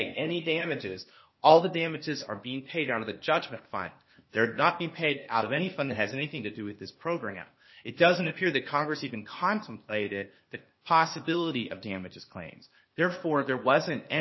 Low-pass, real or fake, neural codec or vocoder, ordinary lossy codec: 7.2 kHz; fake; codec, 16 kHz, 0.8 kbps, ZipCodec; MP3, 24 kbps